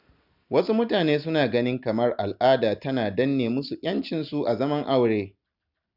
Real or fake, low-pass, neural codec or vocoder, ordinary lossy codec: real; 5.4 kHz; none; none